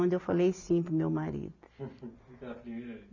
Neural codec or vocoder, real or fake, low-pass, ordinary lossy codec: none; real; 7.2 kHz; none